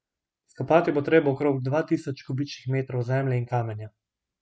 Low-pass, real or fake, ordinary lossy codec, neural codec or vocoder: none; real; none; none